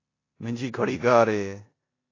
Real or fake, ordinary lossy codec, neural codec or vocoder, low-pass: fake; AAC, 32 kbps; codec, 16 kHz in and 24 kHz out, 0.9 kbps, LongCat-Audio-Codec, four codebook decoder; 7.2 kHz